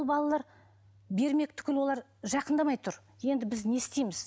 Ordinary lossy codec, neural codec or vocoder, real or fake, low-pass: none; none; real; none